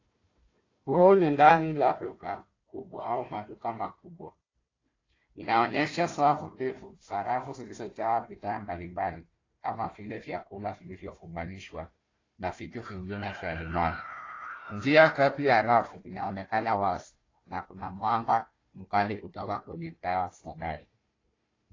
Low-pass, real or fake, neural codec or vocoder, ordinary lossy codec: 7.2 kHz; fake; codec, 16 kHz, 1 kbps, FunCodec, trained on Chinese and English, 50 frames a second; AAC, 32 kbps